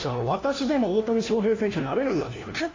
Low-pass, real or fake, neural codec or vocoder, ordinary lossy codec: 7.2 kHz; fake; codec, 16 kHz, 1 kbps, FunCodec, trained on LibriTTS, 50 frames a second; AAC, 32 kbps